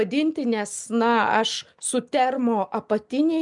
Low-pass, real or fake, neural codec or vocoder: 10.8 kHz; real; none